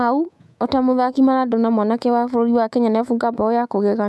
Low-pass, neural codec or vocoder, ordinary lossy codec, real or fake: none; codec, 24 kHz, 3.1 kbps, DualCodec; none; fake